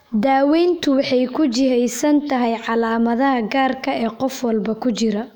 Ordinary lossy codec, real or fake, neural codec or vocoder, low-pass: none; fake; autoencoder, 48 kHz, 128 numbers a frame, DAC-VAE, trained on Japanese speech; 19.8 kHz